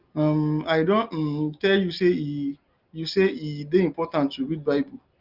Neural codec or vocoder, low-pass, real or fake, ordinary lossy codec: none; 5.4 kHz; real; Opus, 24 kbps